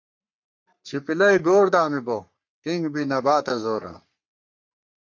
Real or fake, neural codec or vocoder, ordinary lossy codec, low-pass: fake; codec, 44.1 kHz, 3.4 kbps, Pupu-Codec; MP3, 48 kbps; 7.2 kHz